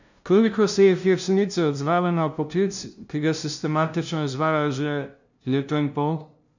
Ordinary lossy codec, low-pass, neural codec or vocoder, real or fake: none; 7.2 kHz; codec, 16 kHz, 0.5 kbps, FunCodec, trained on LibriTTS, 25 frames a second; fake